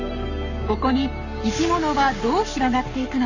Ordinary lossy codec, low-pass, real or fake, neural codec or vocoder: none; 7.2 kHz; fake; codec, 44.1 kHz, 7.8 kbps, Pupu-Codec